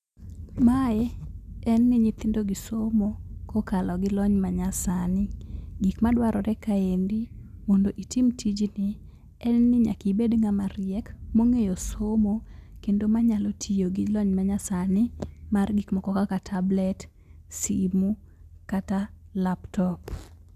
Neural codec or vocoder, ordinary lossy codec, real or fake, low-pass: none; none; real; 14.4 kHz